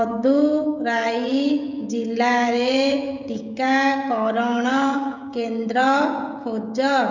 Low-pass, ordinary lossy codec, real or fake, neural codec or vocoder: 7.2 kHz; none; fake; codec, 16 kHz, 16 kbps, FreqCodec, smaller model